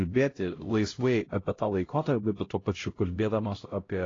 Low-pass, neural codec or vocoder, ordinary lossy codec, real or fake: 7.2 kHz; codec, 16 kHz, 0.5 kbps, X-Codec, HuBERT features, trained on LibriSpeech; AAC, 32 kbps; fake